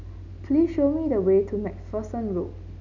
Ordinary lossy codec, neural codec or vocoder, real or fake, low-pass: none; none; real; 7.2 kHz